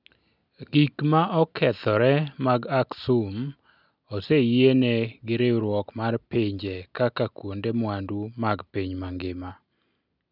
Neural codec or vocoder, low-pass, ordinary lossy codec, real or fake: none; 5.4 kHz; none; real